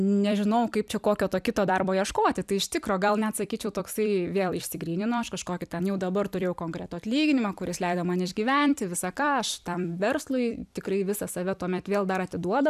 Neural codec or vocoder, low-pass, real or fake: vocoder, 44.1 kHz, 128 mel bands every 256 samples, BigVGAN v2; 14.4 kHz; fake